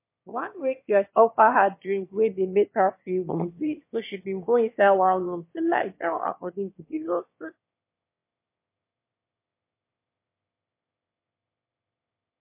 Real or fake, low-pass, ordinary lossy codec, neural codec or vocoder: fake; 3.6 kHz; MP3, 24 kbps; autoencoder, 22.05 kHz, a latent of 192 numbers a frame, VITS, trained on one speaker